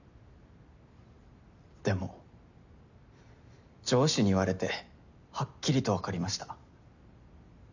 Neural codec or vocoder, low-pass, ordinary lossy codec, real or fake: none; 7.2 kHz; none; real